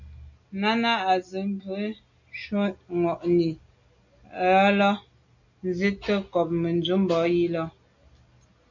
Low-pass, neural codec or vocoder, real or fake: 7.2 kHz; none; real